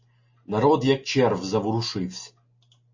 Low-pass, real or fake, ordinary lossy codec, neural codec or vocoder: 7.2 kHz; real; MP3, 32 kbps; none